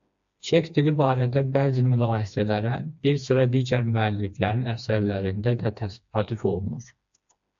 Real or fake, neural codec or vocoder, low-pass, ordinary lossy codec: fake; codec, 16 kHz, 2 kbps, FreqCodec, smaller model; 7.2 kHz; AAC, 64 kbps